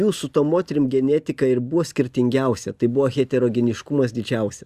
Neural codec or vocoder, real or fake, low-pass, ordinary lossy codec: none; real; 14.4 kHz; Opus, 64 kbps